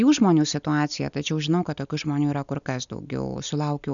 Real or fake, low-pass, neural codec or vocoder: real; 7.2 kHz; none